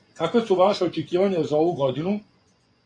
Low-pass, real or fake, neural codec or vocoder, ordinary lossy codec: 9.9 kHz; fake; vocoder, 24 kHz, 100 mel bands, Vocos; AAC, 48 kbps